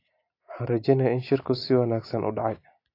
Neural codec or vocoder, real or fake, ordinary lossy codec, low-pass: none; real; AAC, 32 kbps; 5.4 kHz